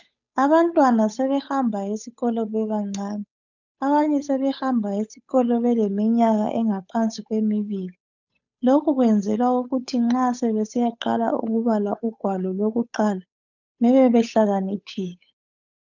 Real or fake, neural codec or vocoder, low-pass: fake; codec, 16 kHz, 8 kbps, FunCodec, trained on Chinese and English, 25 frames a second; 7.2 kHz